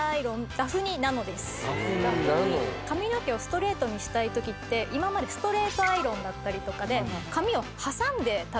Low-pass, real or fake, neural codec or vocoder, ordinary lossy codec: none; real; none; none